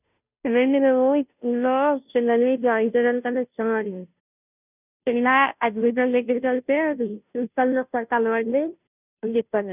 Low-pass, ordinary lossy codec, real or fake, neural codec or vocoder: 3.6 kHz; none; fake; codec, 16 kHz, 0.5 kbps, FunCodec, trained on Chinese and English, 25 frames a second